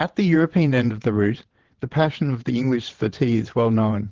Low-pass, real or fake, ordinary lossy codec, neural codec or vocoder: 7.2 kHz; fake; Opus, 16 kbps; vocoder, 22.05 kHz, 80 mel bands, WaveNeXt